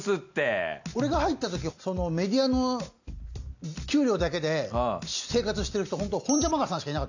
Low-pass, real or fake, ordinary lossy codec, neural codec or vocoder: 7.2 kHz; real; none; none